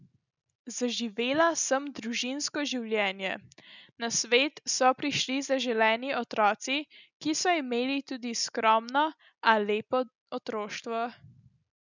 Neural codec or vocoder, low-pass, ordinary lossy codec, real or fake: none; 7.2 kHz; none; real